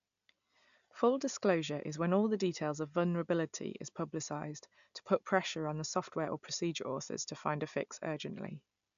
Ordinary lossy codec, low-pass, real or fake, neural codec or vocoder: AAC, 96 kbps; 7.2 kHz; real; none